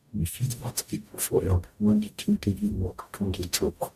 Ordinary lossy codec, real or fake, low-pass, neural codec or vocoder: none; fake; 14.4 kHz; codec, 44.1 kHz, 0.9 kbps, DAC